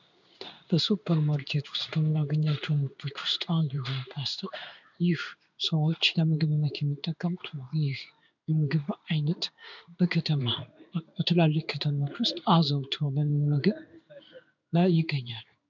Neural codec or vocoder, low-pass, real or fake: codec, 16 kHz in and 24 kHz out, 1 kbps, XY-Tokenizer; 7.2 kHz; fake